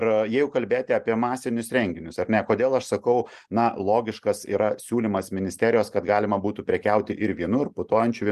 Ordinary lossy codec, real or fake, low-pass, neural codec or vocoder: Opus, 32 kbps; real; 14.4 kHz; none